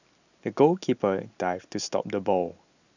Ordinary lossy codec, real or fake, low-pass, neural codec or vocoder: none; real; 7.2 kHz; none